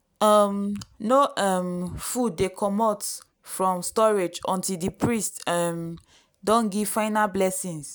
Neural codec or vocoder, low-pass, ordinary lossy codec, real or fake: none; none; none; real